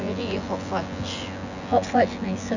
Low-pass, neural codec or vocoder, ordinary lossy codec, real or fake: 7.2 kHz; vocoder, 24 kHz, 100 mel bands, Vocos; none; fake